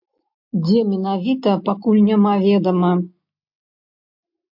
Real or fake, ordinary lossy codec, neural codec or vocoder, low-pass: real; MP3, 48 kbps; none; 5.4 kHz